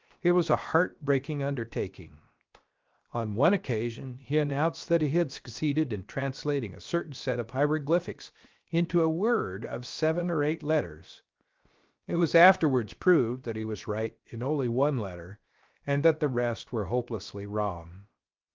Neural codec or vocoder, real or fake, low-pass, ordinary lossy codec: codec, 16 kHz, 0.7 kbps, FocalCodec; fake; 7.2 kHz; Opus, 32 kbps